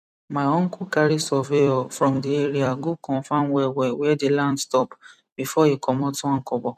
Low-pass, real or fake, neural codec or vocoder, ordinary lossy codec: 14.4 kHz; fake; vocoder, 44.1 kHz, 128 mel bands every 256 samples, BigVGAN v2; none